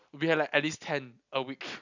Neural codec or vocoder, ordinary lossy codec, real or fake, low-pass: none; none; real; 7.2 kHz